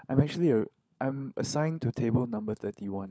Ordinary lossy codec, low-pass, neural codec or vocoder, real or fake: none; none; codec, 16 kHz, 16 kbps, FunCodec, trained on LibriTTS, 50 frames a second; fake